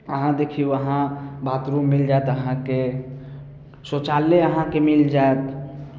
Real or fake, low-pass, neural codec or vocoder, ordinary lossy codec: real; none; none; none